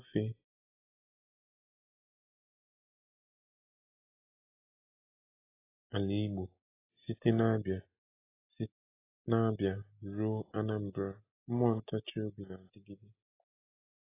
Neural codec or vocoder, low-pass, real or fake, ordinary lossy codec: none; 3.6 kHz; real; AAC, 16 kbps